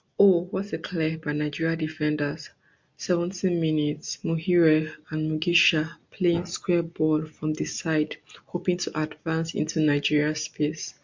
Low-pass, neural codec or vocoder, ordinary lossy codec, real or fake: 7.2 kHz; none; MP3, 48 kbps; real